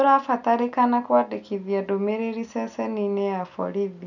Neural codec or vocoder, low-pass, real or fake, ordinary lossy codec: none; 7.2 kHz; real; none